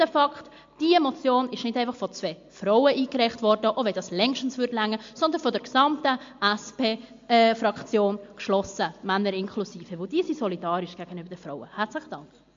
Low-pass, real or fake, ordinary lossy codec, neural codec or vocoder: 7.2 kHz; real; MP3, 48 kbps; none